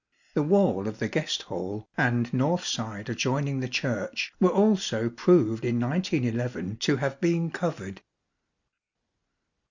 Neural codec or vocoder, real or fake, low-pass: none; real; 7.2 kHz